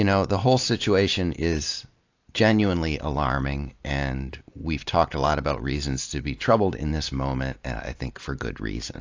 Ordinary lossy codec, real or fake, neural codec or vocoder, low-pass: AAC, 48 kbps; real; none; 7.2 kHz